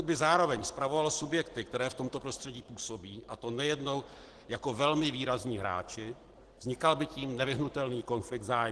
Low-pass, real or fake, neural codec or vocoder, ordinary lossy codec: 10.8 kHz; real; none; Opus, 16 kbps